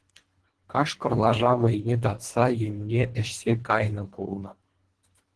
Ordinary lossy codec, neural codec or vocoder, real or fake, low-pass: Opus, 16 kbps; codec, 24 kHz, 1.5 kbps, HILCodec; fake; 10.8 kHz